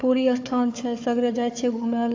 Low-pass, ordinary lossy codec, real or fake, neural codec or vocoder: 7.2 kHz; AAC, 48 kbps; fake; codec, 16 kHz, 4 kbps, FunCodec, trained on Chinese and English, 50 frames a second